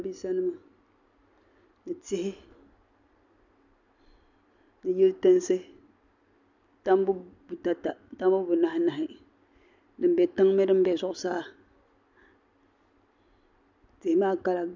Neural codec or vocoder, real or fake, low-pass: none; real; 7.2 kHz